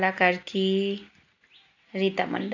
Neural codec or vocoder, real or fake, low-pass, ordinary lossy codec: none; real; 7.2 kHz; none